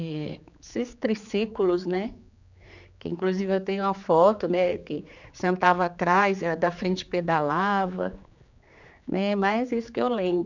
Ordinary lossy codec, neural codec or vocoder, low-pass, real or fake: none; codec, 16 kHz, 4 kbps, X-Codec, HuBERT features, trained on general audio; 7.2 kHz; fake